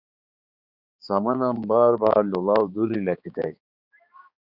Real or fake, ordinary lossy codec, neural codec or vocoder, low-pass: fake; Opus, 64 kbps; codec, 16 kHz, 4 kbps, X-Codec, HuBERT features, trained on balanced general audio; 5.4 kHz